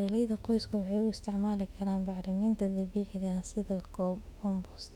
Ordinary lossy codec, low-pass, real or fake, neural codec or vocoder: none; 19.8 kHz; fake; autoencoder, 48 kHz, 32 numbers a frame, DAC-VAE, trained on Japanese speech